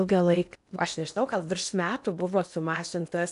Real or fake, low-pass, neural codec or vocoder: fake; 10.8 kHz; codec, 16 kHz in and 24 kHz out, 0.8 kbps, FocalCodec, streaming, 65536 codes